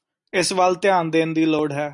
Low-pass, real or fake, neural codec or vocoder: 10.8 kHz; real; none